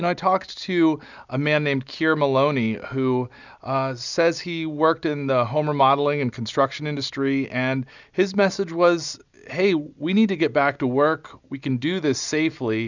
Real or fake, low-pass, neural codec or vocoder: real; 7.2 kHz; none